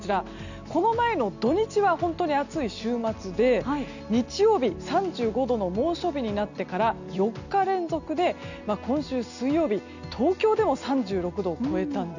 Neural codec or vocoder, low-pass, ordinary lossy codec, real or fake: none; 7.2 kHz; none; real